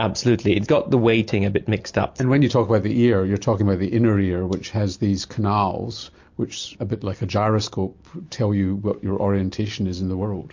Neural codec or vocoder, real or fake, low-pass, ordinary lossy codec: none; real; 7.2 kHz; MP3, 48 kbps